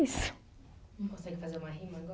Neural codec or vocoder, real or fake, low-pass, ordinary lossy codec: none; real; none; none